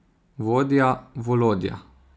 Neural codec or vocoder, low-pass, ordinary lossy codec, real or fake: none; none; none; real